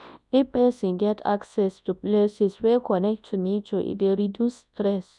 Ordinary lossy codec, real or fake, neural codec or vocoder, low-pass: none; fake; codec, 24 kHz, 0.9 kbps, WavTokenizer, large speech release; 10.8 kHz